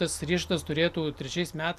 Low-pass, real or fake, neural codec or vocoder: 14.4 kHz; real; none